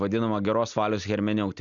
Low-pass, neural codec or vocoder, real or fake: 7.2 kHz; none; real